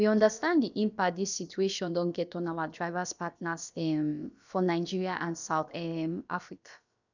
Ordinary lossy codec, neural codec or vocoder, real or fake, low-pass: none; codec, 16 kHz, about 1 kbps, DyCAST, with the encoder's durations; fake; 7.2 kHz